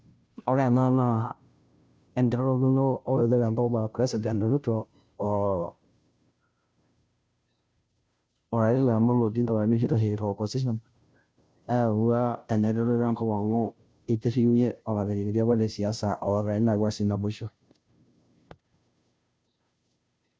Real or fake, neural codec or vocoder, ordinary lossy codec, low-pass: fake; codec, 16 kHz, 0.5 kbps, FunCodec, trained on Chinese and English, 25 frames a second; none; none